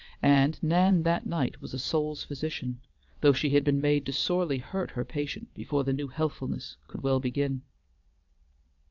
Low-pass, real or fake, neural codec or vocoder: 7.2 kHz; fake; vocoder, 22.05 kHz, 80 mel bands, WaveNeXt